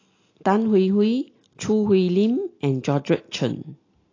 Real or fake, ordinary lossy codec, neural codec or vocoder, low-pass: real; AAC, 32 kbps; none; 7.2 kHz